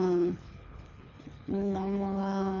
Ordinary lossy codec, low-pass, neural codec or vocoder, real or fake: none; 7.2 kHz; codec, 24 kHz, 3 kbps, HILCodec; fake